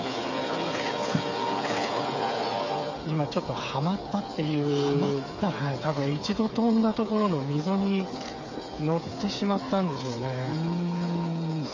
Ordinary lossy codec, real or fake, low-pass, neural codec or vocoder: MP3, 32 kbps; fake; 7.2 kHz; codec, 16 kHz, 8 kbps, FreqCodec, smaller model